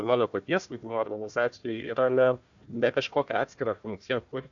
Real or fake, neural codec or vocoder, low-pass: fake; codec, 16 kHz, 1 kbps, FunCodec, trained on Chinese and English, 50 frames a second; 7.2 kHz